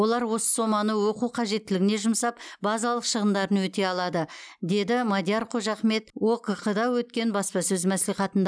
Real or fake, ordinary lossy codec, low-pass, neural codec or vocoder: real; none; none; none